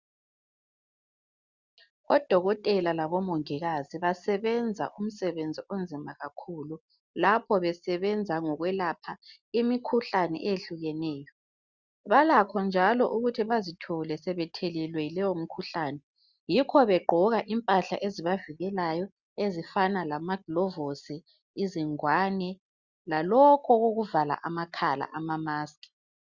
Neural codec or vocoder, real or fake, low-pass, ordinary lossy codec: none; real; 7.2 kHz; Opus, 64 kbps